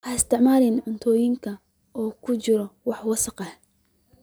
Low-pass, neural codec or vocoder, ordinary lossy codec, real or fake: none; none; none; real